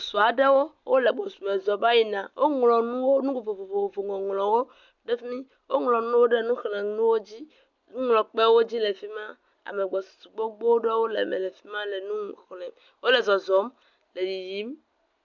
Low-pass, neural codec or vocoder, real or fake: 7.2 kHz; none; real